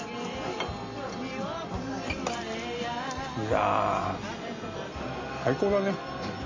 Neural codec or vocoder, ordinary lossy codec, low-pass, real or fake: none; MP3, 32 kbps; 7.2 kHz; real